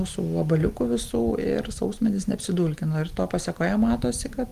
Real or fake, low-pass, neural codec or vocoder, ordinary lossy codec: real; 14.4 kHz; none; Opus, 24 kbps